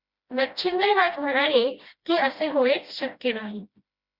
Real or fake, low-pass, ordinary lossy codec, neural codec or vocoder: fake; 5.4 kHz; Opus, 64 kbps; codec, 16 kHz, 1 kbps, FreqCodec, smaller model